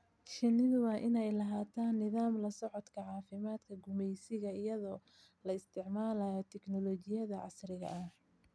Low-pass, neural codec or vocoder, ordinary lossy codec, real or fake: none; none; none; real